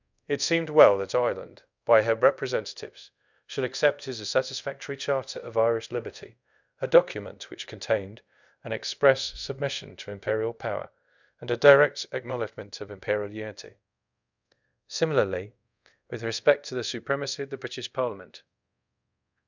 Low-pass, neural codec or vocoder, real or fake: 7.2 kHz; codec, 24 kHz, 0.5 kbps, DualCodec; fake